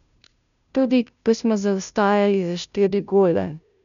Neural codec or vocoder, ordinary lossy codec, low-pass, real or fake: codec, 16 kHz, 0.5 kbps, FunCodec, trained on Chinese and English, 25 frames a second; none; 7.2 kHz; fake